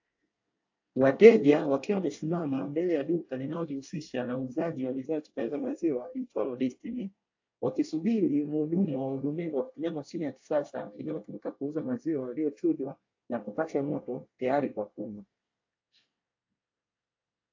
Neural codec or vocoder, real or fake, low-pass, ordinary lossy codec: codec, 24 kHz, 1 kbps, SNAC; fake; 7.2 kHz; AAC, 48 kbps